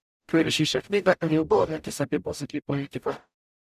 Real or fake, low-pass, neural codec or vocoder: fake; 14.4 kHz; codec, 44.1 kHz, 0.9 kbps, DAC